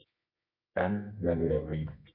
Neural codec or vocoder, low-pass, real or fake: codec, 24 kHz, 0.9 kbps, WavTokenizer, medium music audio release; 5.4 kHz; fake